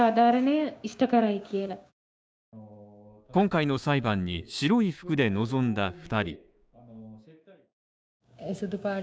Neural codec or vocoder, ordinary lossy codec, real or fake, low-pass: codec, 16 kHz, 6 kbps, DAC; none; fake; none